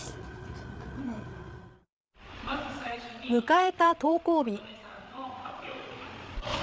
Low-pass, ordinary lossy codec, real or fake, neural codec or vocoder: none; none; fake; codec, 16 kHz, 8 kbps, FreqCodec, larger model